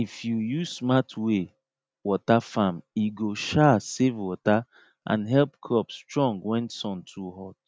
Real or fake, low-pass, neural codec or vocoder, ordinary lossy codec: real; none; none; none